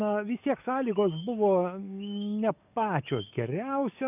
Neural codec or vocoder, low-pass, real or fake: codec, 44.1 kHz, 7.8 kbps, DAC; 3.6 kHz; fake